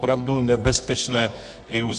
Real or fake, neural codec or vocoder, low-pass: fake; codec, 24 kHz, 0.9 kbps, WavTokenizer, medium music audio release; 10.8 kHz